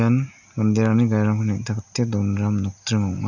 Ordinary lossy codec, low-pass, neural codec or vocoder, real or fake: none; 7.2 kHz; none; real